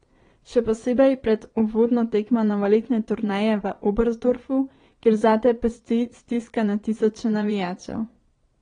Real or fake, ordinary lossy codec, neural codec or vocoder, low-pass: fake; AAC, 32 kbps; vocoder, 22.05 kHz, 80 mel bands, Vocos; 9.9 kHz